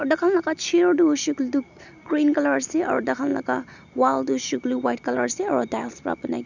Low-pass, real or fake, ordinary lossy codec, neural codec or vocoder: 7.2 kHz; real; none; none